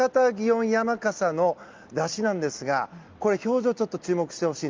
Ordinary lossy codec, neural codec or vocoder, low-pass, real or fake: Opus, 24 kbps; none; 7.2 kHz; real